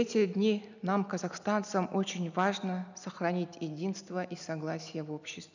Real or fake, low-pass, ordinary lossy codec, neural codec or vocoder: real; 7.2 kHz; none; none